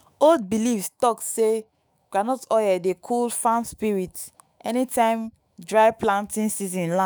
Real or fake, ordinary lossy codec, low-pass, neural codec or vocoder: fake; none; none; autoencoder, 48 kHz, 128 numbers a frame, DAC-VAE, trained on Japanese speech